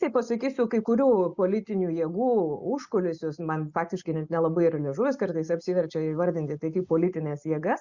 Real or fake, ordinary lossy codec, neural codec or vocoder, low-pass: real; Opus, 64 kbps; none; 7.2 kHz